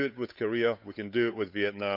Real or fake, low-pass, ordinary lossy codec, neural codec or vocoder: fake; 5.4 kHz; none; codec, 16 kHz, 8 kbps, FunCodec, trained on Chinese and English, 25 frames a second